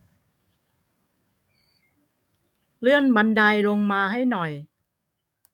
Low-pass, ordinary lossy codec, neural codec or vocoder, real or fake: 19.8 kHz; none; codec, 44.1 kHz, 7.8 kbps, DAC; fake